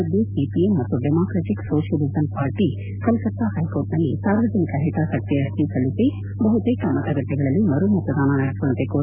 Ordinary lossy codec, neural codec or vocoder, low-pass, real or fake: none; none; 3.6 kHz; real